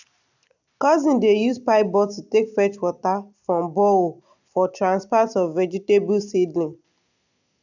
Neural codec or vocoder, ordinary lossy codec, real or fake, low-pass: none; none; real; 7.2 kHz